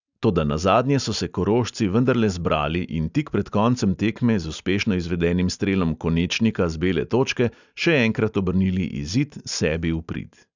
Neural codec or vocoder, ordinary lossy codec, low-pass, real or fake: none; none; 7.2 kHz; real